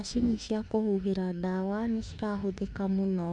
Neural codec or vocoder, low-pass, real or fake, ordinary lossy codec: autoencoder, 48 kHz, 32 numbers a frame, DAC-VAE, trained on Japanese speech; 10.8 kHz; fake; none